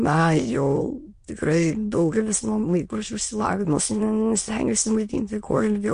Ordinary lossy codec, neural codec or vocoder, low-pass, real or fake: MP3, 48 kbps; autoencoder, 22.05 kHz, a latent of 192 numbers a frame, VITS, trained on many speakers; 9.9 kHz; fake